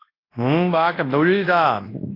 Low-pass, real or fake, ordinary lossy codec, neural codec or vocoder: 5.4 kHz; fake; AAC, 24 kbps; codec, 24 kHz, 0.9 kbps, WavTokenizer, large speech release